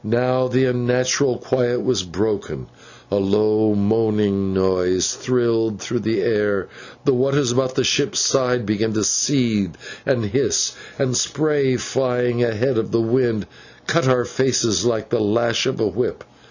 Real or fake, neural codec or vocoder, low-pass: real; none; 7.2 kHz